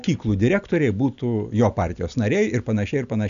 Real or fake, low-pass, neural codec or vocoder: real; 7.2 kHz; none